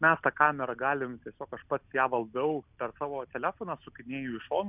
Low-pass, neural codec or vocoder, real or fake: 3.6 kHz; none; real